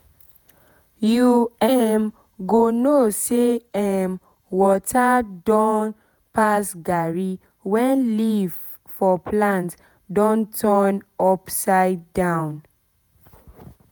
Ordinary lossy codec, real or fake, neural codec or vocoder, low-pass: none; fake; vocoder, 48 kHz, 128 mel bands, Vocos; none